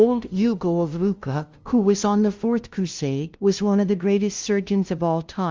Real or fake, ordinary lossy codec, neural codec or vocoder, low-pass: fake; Opus, 32 kbps; codec, 16 kHz, 0.5 kbps, FunCodec, trained on LibriTTS, 25 frames a second; 7.2 kHz